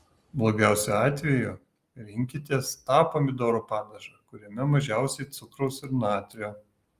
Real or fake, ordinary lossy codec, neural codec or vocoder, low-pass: real; Opus, 32 kbps; none; 14.4 kHz